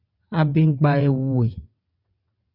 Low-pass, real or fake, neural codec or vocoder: 5.4 kHz; fake; vocoder, 22.05 kHz, 80 mel bands, WaveNeXt